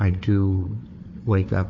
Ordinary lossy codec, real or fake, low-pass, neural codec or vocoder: MP3, 32 kbps; fake; 7.2 kHz; codec, 16 kHz, 4 kbps, FunCodec, trained on Chinese and English, 50 frames a second